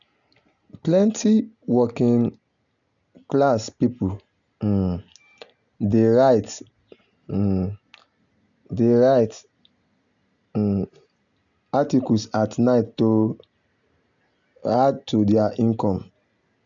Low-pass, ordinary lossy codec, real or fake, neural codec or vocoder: 7.2 kHz; none; real; none